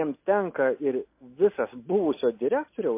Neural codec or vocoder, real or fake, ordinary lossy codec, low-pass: none; real; MP3, 24 kbps; 3.6 kHz